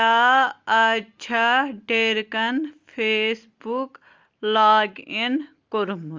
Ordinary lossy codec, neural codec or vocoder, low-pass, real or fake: Opus, 32 kbps; none; 7.2 kHz; real